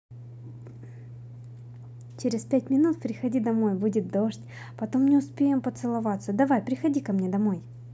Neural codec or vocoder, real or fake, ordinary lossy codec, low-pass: none; real; none; none